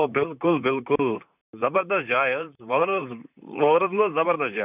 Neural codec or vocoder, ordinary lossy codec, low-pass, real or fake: vocoder, 44.1 kHz, 128 mel bands, Pupu-Vocoder; none; 3.6 kHz; fake